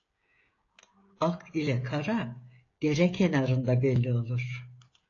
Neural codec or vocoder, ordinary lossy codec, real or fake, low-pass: codec, 16 kHz, 16 kbps, FreqCodec, smaller model; AAC, 32 kbps; fake; 7.2 kHz